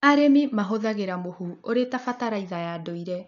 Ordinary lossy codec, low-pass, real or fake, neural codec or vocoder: none; 7.2 kHz; real; none